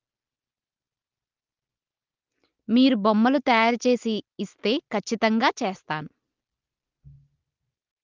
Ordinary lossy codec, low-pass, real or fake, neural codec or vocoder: Opus, 32 kbps; 7.2 kHz; real; none